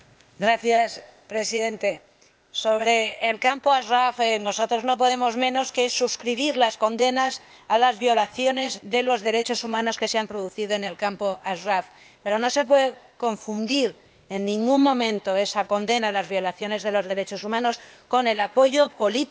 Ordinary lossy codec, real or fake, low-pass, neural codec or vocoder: none; fake; none; codec, 16 kHz, 0.8 kbps, ZipCodec